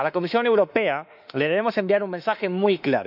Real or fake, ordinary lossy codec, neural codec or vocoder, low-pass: fake; none; autoencoder, 48 kHz, 32 numbers a frame, DAC-VAE, trained on Japanese speech; 5.4 kHz